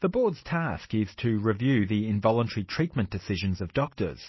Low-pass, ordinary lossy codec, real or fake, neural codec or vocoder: 7.2 kHz; MP3, 24 kbps; real; none